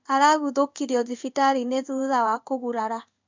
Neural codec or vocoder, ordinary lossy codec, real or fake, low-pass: codec, 16 kHz in and 24 kHz out, 1 kbps, XY-Tokenizer; MP3, 64 kbps; fake; 7.2 kHz